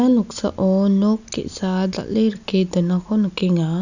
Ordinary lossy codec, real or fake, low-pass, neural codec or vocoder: none; real; 7.2 kHz; none